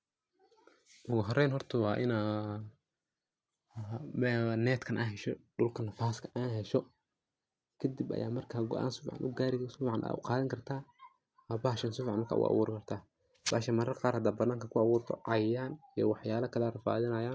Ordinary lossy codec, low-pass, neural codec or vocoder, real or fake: none; none; none; real